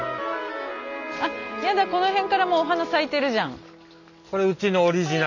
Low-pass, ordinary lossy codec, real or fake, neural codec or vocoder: 7.2 kHz; none; real; none